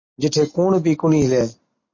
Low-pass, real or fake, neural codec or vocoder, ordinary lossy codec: 7.2 kHz; real; none; MP3, 32 kbps